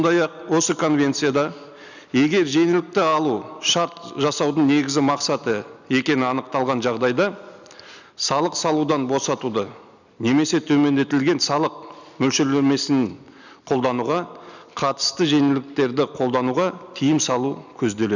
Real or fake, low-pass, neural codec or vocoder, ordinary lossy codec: real; 7.2 kHz; none; none